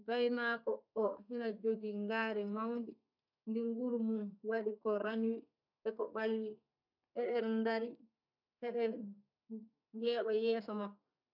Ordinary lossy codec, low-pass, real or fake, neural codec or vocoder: none; 5.4 kHz; fake; codec, 32 kHz, 1.9 kbps, SNAC